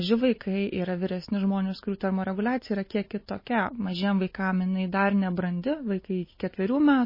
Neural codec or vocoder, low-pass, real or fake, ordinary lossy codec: none; 5.4 kHz; real; MP3, 24 kbps